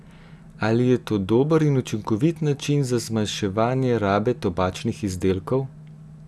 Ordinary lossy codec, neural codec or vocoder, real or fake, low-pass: none; none; real; none